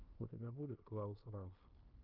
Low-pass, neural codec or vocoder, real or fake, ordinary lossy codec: 5.4 kHz; codec, 16 kHz in and 24 kHz out, 0.9 kbps, LongCat-Audio-Codec, fine tuned four codebook decoder; fake; Opus, 32 kbps